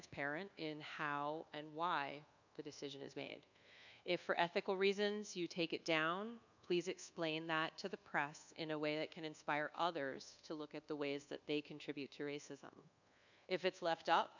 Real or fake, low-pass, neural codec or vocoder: fake; 7.2 kHz; codec, 24 kHz, 1.2 kbps, DualCodec